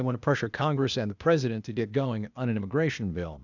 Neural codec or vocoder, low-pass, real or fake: codec, 16 kHz, 0.8 kbps, ZipCodec; 7.2 kHz; fake